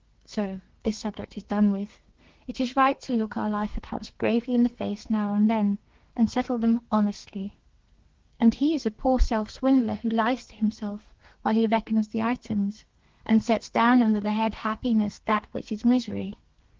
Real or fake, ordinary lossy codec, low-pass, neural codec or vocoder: fake; Opus, 16 kbps; 7.2 kHz; codec, 32 kHz, 1.9 kbps, SNAC